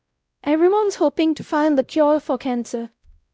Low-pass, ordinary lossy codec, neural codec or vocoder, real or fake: none; none; codec, 16 kHz, 0.5 kbps, X-Codec, WavLM features, trained on Multilingual LibriSpeech; fake